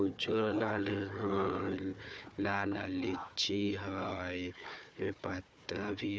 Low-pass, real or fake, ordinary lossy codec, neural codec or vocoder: none; fake; none; codec, 16 kHz, 4 kbps, FunCodec, trained on Chinese and English, 50 frames a second